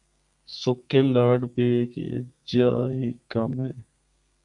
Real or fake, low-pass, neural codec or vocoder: fake; 10.8 kHz; codec, 32 kHz, 1.9 kbps, SNAC